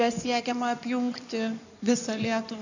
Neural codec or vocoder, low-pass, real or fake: codec, 16 kHz in and 24 kHz out, 2.2 kbps, FireRedTTS-2 codec; 7.2 kHz; fake